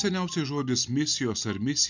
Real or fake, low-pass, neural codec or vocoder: real; 7.2 kHz; none